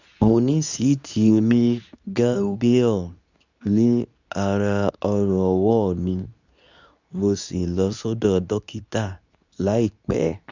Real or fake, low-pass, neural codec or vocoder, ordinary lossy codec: fake; 7.2 kHz; codec, 24 kHz, 0.9 kbps, WavTokenizer, medium speech release version 2; none